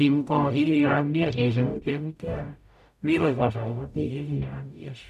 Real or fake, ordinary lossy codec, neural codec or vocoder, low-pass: fake; none; codec, 44.1 kHz, 0.9 kbps, DAC; 14.4 kHz